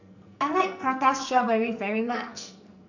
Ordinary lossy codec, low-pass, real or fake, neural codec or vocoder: none; 7.2 kHz; fake; codec, 44.1 kHz, 2.6 kbps, SNAC